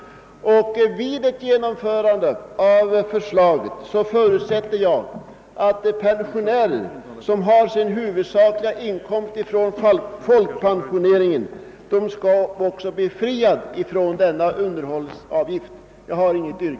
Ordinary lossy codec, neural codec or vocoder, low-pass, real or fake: none; none; none; real